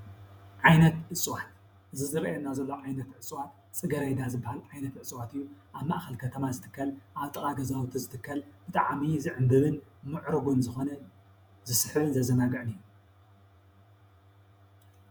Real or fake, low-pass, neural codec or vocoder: fake; 19.8 kHz; vocoder, 48 kHz, 128 mel bands, Vocos